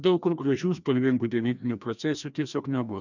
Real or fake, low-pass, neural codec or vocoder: fake; 7.2 kHz; codec, 16 kHz, 1 kbps, FreqCodec, larger model